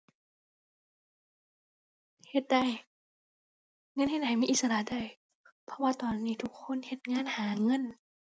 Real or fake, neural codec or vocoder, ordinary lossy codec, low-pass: real; none; none; none